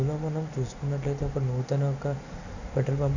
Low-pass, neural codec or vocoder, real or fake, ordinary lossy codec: 7.2 kHz; none; real; none